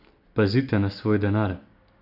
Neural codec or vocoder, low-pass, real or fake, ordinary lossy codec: none; 5.4 kHz; real; none